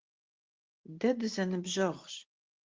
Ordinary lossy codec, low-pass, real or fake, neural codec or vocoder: Opus, 16 kbps; 7.2 kHz; real; none